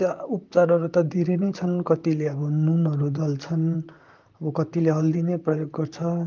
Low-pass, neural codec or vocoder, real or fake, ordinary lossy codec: 7.2 kHz; vocoder, 44.1 kHz, 128 mel bands, Pupu-Vocoder; fake; Opus, 32 kbps